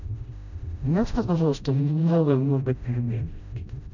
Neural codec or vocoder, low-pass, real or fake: codec, 16 kHz, 0.5 kbps, FreqCodec, smaller model; 7.2 kHz; fake